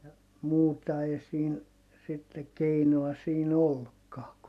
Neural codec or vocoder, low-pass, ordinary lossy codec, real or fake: none; 14.4 kHz; none; real